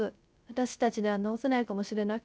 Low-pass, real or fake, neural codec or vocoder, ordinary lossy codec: none; fake; codec, 16 kHz, 0.3 kbps, FocalCodec; none